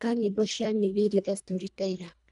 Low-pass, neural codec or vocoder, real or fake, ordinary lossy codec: 10.8 kHz; codec, 24 kHz, 1.5 kbps, HILCodec; fake; none